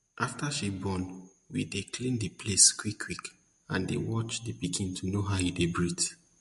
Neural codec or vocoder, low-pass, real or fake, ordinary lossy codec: none; 14.4 kHz; real; MP3, 48 kbps